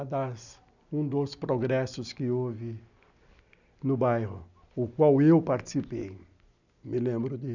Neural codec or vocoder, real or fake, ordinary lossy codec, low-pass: none; real; none; 7.2 kHz